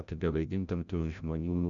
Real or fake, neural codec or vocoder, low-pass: fake; codec, 16 kHz, 0.5 kbps, FreqCodec, larger model; 7.2 kHz